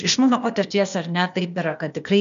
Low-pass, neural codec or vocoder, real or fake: 7.2 kHz; codec, 16 kHz, 0.8 kbps, ZipCodec; fake